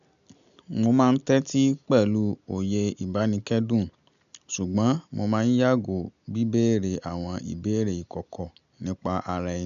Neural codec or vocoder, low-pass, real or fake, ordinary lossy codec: none; 7.2 kHz; real; none